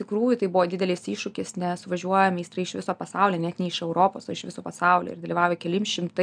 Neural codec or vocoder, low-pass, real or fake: none; 9.9 kHz; real